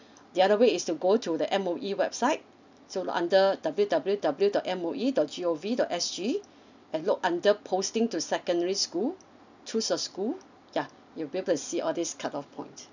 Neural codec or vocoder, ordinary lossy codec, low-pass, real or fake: none; none; 7.2 kHz; real